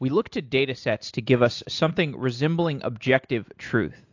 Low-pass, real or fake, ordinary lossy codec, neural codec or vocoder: 7.2 kHz; real; AAC, 48 kbps; none